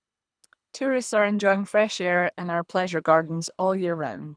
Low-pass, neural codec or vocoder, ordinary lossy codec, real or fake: 9.9 kHz; codec, 24 kHz, 3 kbps, HILCodec; none; fake